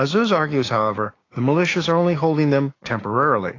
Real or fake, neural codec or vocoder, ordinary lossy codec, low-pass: fake; codec, 16 kHz, 6 kbps, DAC; AAC, 32 kbps; 7.2 kHz